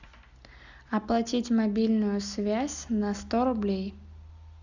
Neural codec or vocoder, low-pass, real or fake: none; 7.2 kHz; real